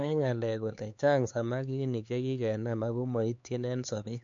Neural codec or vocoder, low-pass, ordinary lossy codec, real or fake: codec, 16 kHz, 4 kbps, X-Codec, HuBERT features, trained on LibriSpeech; 7.2 kHz; MP3, 48 kbps; fake